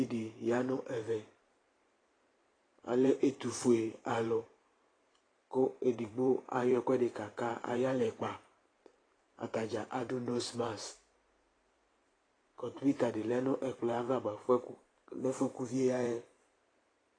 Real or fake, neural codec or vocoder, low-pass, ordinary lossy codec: fake; vocoder, 44.1 kHz, 128 mel bands every 512 samples, BigVGAN v2; 9.9 kHz; AAC, 32 kbps